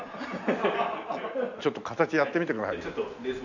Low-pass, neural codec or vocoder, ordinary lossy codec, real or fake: 7.2 kHz; none; none; real